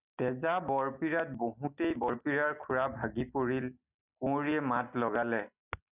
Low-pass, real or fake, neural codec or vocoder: 3.6 kHz; real; none